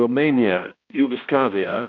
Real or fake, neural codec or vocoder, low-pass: fake; codec, 16 kHz, 1 kbps, X-Codec, HuBERT features, trained on balanced general audio; 7.2 kHz